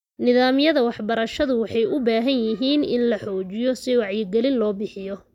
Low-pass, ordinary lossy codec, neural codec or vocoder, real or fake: 19.8 kHz; none; none; real